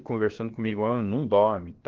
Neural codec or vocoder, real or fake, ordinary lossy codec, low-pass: codec, 16 kHz, about 1 kbps, DyCAST, with the encoder's durations; fake; Opus, 16 kbps; 7.2 kHz